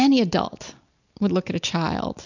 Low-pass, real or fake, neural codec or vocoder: 7.2 kHz; real; none